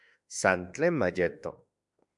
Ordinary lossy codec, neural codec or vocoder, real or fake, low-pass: MP3, 96 kbps; autoencoder, 48 kHz, 32 numbers a frame, DAC-VAE, trained on Japanese speech; fake; 10.8 kHz